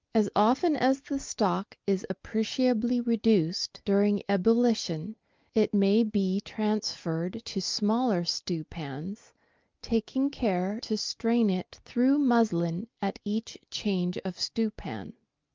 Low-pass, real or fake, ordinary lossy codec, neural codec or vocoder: 7.2 kHz; real; Opus, 32 kbps; none